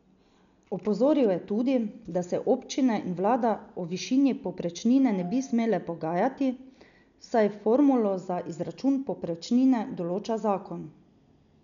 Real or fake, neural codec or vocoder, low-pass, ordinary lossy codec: real; none; 7.2 kHz; none